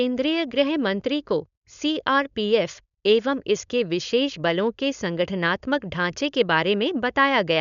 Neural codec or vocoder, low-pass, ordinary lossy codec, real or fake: codec, 16 kHz, 4.8 kbps, FACodec; 7.2 kHz; none; fake